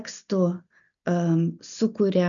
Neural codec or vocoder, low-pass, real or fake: none; 7.2 kHz; real